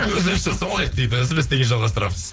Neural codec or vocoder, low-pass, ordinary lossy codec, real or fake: codec, 16 kHz, 4.8 kbps, FACodec; none; none; fake